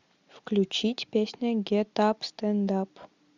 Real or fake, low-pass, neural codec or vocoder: real; 7.2 kHz; none